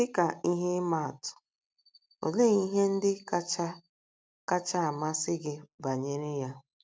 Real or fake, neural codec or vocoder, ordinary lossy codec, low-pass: real; none; none; none